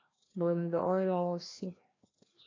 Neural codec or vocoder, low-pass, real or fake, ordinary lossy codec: codec, 16 kHz, 1 kbps, FreqCodec, larger model; 7.2 kHz; fake; AAC, 32 kbps